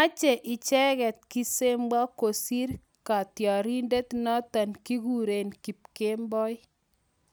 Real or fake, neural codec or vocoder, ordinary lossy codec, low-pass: real; none; none; none